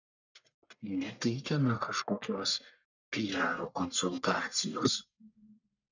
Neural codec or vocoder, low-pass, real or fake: codec, 44.1 kHz, 1.7 kbps, Pupu-Codec; 7.2 kHz; fake